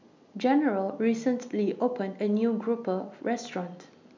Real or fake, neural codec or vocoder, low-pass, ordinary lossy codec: real; none; 7.2 kHz; MP3, 64 kbps